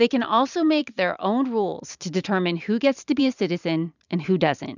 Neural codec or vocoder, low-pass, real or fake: vocoder, 44.1 kHz, 128 mel bands every 512 samples, BigVGAN v2; 7.2 kHz; fake